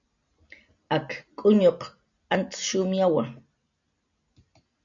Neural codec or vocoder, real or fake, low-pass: none; real; 7.2 kHz